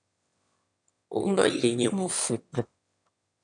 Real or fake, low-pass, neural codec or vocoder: fake; 9.9 kHz; autoencoder, 22.05 kHz, a latent of 192 numbers a frame, VITS, trained on one speaker